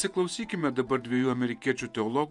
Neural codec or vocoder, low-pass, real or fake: vocoder, 48 kHz, 128 mel bands, Vocos; 10.8 kHz; fake